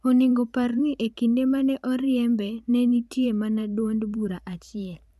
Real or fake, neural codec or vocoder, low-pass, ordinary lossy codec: fake; vocoder, 44.1 kHz, 128 mel bands every 512 samples, BigVGAN v2; 14.4 kHz; none